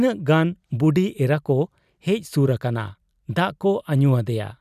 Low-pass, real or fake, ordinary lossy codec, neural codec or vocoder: 14.4 kHz; fake; none; vocoder, 44.1 kHz, 128 mel bands every 256 samples, BigVGAN v2